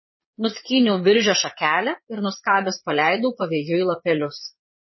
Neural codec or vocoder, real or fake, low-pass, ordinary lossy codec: vocoder, 22.05 kHz, 80 mel bands, Vocos; fake; 7.2 kHz; MP3, 24 kbps